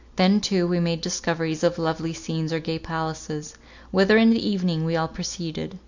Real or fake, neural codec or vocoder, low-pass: real; none; 7.2 kHz